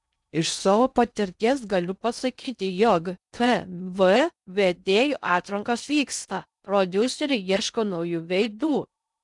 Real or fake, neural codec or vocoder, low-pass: fake; codec, 16 kHz in and 24 kHz out, 0.6 kbps, FocalCodec, streaming, 2048 codes; 10.8 kHz